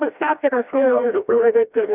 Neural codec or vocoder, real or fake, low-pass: codec, 16 kHz, 1 kbps, FreqCodec, smaller model; fake; 3.6 kHz